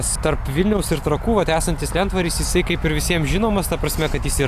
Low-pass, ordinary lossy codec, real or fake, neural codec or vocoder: 14.4 kHz; AAC, 96 kbps; real; none